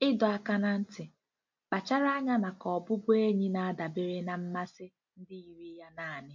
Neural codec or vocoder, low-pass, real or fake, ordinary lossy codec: none; 7.2 kHz; real; MP3, 48 kbps